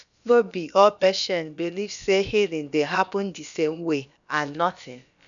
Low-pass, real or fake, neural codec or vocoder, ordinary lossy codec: 7.2 kHz; fake; codec, 16 kHz, about 1 kbps, DyCAST, with the encoder's durations; none